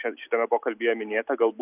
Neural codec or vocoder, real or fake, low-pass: none; real; 3.6 kHz